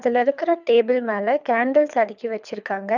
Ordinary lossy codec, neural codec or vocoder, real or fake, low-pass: none; codec, 24 kHz, 3 kbps, HILCodec; fake; 7.2 kHz